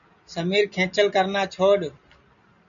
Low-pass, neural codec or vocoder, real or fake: 7.2 kHz; none; real